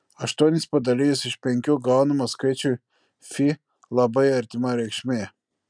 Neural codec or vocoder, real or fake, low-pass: none; real; 9.9 kHz